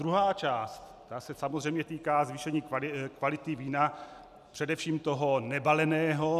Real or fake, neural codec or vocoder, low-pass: real; none; 14.4 kHz